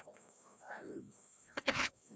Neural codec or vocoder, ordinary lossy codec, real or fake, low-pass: codec, 16 kHz, 1 kbps, FreqCodec, larger model; none; fake; none